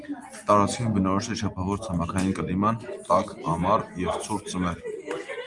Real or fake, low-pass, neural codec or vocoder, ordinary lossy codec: real; 10.8 kHz; none; Opus, 32 kbps